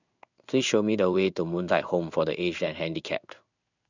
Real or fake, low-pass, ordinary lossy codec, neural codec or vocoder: fake; 7.2 kHz; none; codec, 16 kHz in and 24 kHz out, 1 kbps, XY-Tokenizer